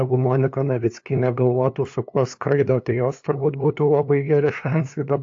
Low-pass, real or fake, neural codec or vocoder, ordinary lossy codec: 7.2 kHz; fake; codec, 16 kHz, 2 kbps, FunCodec, trained on LibriTTS, 25 frames a second; AAC, 48 kbps